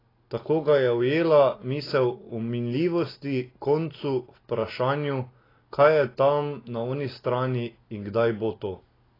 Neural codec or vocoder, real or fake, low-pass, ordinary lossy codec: none; real; 5.4 kHz; AAC, 24 kbps